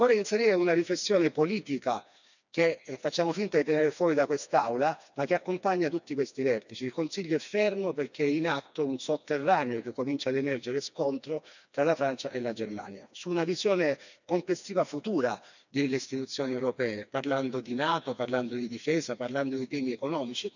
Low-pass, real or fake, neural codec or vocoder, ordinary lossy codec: 7.2 kHz; fake; codec, 16 kHz, 2 kbps, FreqCodec, smaller model; none